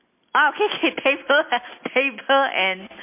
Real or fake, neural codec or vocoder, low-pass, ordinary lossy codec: real; none; 3.6 kHz; MP3, 24 kbps